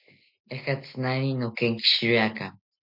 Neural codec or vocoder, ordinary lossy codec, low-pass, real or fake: none; MP3, 48 kbps; 5.4 kHz; real